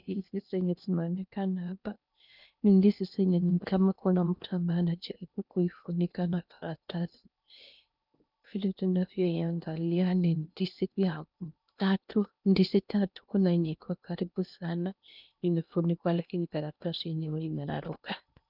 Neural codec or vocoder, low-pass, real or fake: codec, 16 kHz in and 24 kHz out, 0.8 kbps, FocalCodec, streaming, 65536 codes; 5.4 kHz; fake